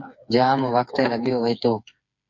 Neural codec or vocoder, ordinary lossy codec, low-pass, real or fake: codec, 16 kHz, 4 kbps, FreqCodec, smaller model; MP3, 48 kbps; 7.2 kHz; fake